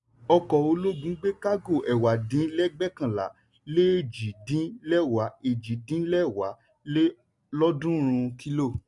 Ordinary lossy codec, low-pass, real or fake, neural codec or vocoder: none; 10.8 kHz; real; none